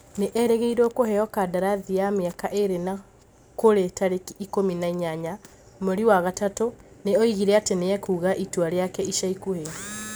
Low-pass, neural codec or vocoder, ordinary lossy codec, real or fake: none; none; none; real